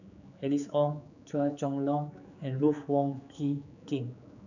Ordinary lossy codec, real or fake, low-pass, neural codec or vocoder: none; fake; 7.2 kHz; codec, 16 kHz, 4 kbps, X-Codec, HuBERT features, trained on general audio